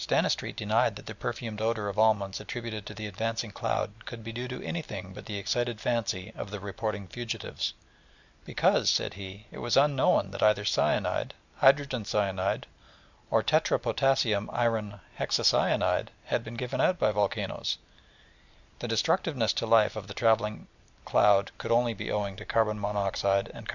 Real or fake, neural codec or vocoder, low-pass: real; none; 7.2 kHz